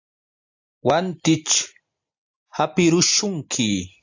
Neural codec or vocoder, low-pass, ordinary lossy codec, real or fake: none; 7.2 kHz; AAC, 48 kbps; real